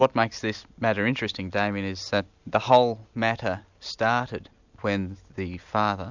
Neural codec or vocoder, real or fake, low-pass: none; real; 7.2 kHz